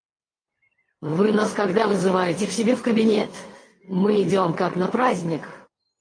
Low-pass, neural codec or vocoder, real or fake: 9.9 kHz; none; real